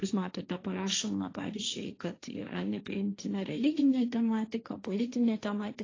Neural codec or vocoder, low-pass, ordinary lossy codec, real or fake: codec, 16 kHz, 1.1 kbps, Voila-Tokenizer; 7.2 kHz; AAC, 32 kbps; fake